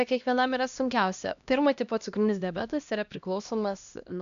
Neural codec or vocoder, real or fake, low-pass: codec, 16 kHz, 1 kbps, X-Codec, HuBERT features, trained on LibriSpeech; fake; 7.2 kHz